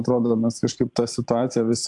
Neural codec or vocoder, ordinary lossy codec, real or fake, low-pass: vocoder, 44.1 kHz, 128 mel bands every 512 samples, BigVGAN v2; AAC, 64 kbps; fake; 10.8 kHz